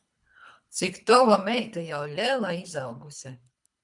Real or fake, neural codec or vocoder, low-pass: fake; codec, 24 kHz, 3 kbps, HILCodec; 10.8 kHz